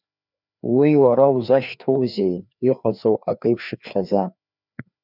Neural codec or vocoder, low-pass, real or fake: codec, 16 kHz, 2 kbps, FreqCodec, larger model; 5.4 kHz; fake